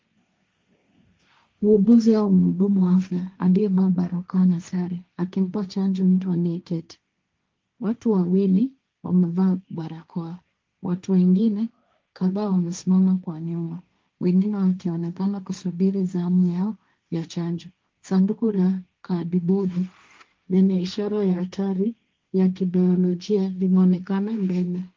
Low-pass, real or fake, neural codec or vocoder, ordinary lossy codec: 7.2 kHz; fake; codec, 16 kHz, 1.1 kbps, Voila-Tokenizer; Opus, 32 kbps